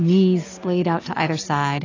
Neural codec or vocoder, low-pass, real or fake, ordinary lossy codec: none; 7.2 kHz; real; AAC, 32 kbps